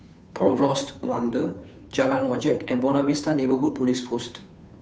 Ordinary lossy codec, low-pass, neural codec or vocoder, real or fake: none; none; codec, 16 kHz, 2 kbps, FunCodec, trained on Chinese and English, 25 frames a second; fake